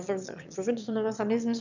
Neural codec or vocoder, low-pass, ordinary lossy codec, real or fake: autoencoder, 22.05 kHz, a latent of 192 numbers a frame, VITS, trained on one speaker; 7.2 kHz; none; fake